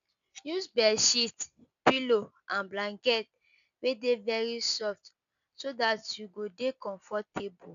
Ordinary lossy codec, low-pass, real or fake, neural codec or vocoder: none; 7.2 kHz; real; none